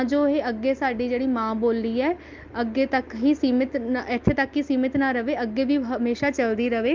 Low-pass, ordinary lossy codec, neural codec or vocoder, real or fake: 7.2 kHz; Opus, 32 kbps; none; real